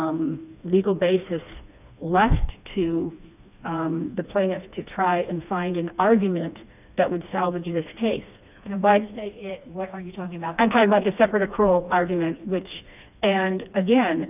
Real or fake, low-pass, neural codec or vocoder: fake; 3.6 kHz; codec, 16 kHz, 2 kbps, FreqCodec, smaller model